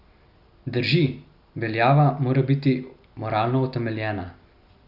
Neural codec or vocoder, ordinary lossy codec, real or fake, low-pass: none; Opus, 64 kbps; real; 5.4 kHz